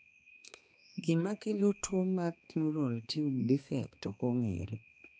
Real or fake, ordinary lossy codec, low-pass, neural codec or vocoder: fake; none; none; codec, 16 kHz, 2 kbps, X-Codec, HuBERT features, trained on balanced general audio